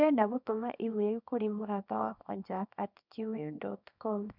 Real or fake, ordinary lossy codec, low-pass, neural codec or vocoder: fake; none; 5.4 kHz; codec, 24 kHz, 0.9 kbps, WavTokenizer, small release